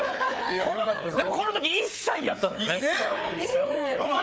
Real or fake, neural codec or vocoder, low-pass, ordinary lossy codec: fake; codec, 16 kHz, 4 kbps, FreqCodec, larger model; none; none